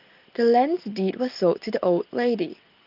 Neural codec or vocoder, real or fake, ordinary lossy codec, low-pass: none; real; Opus, 24 kbps; 5.4 kHz